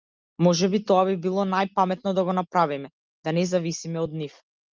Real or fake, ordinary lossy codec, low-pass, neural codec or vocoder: real; Opus, 32 kbps; 7.2 kHz; none